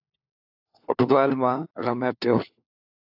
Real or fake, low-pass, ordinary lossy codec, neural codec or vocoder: fake; 5.4 kHz; AAC, 48 kbps; codec, 16 kHz, 4 kbps, FunCodec, trained on LibriTTS, 50 frames a second